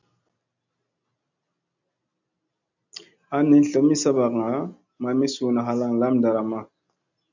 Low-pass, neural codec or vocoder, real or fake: 7.2 kHz; none; real